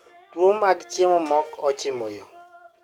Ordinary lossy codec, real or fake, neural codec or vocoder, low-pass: MP3, 96 kbps; fake; codec, 44.1 kHz, 7.8 kbps, DAC; 19.8 kHz